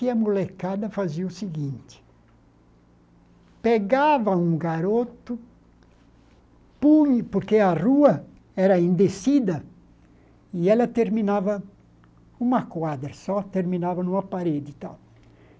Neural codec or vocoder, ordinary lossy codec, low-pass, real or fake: none; none; none; real